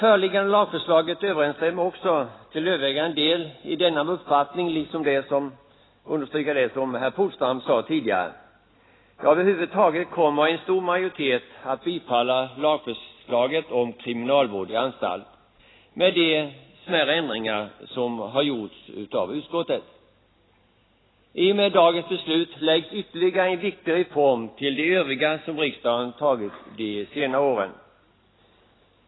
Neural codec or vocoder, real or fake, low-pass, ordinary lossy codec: none; real; 7.2 kHz; AAC, 16 kbps